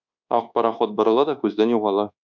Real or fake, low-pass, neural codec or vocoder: fake; 7.2 kHz; codec, 24 kHz, 1.2 kbps, DualCodec